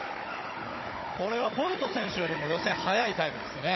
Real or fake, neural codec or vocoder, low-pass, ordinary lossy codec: fake; codec, 16 kHz, 16 kbps, FunCodec, trained on Chinese and English, 50 frames a second; 7.2 kHz; MP3, 24 kbps